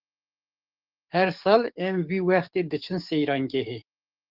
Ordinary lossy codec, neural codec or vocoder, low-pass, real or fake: Opus, 32 kbps; codec, 44.1 kHz, 7.8 kbps, DAC; 5.4 kHz; fake